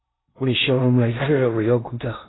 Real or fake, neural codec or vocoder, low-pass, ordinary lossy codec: fake; codec, 16 kHz in and 24 kHz out, 0.6 kbps, FocalCodec, streaming, 2048 codes; 7.2 kHz; AAC, 16 kbps